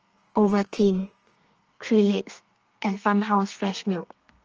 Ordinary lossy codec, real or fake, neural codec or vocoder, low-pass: Opus, 24 kbps; fake; codec, 32 kHz, 1.9 kbps, SNAC; 7.2 kHz